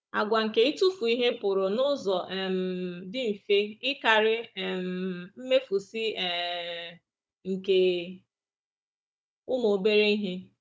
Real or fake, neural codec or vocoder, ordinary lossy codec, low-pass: fake; codec, 16 kHz, 16 kbps, FunCodec, trained on Chinese and English, 50 frames a second; none; none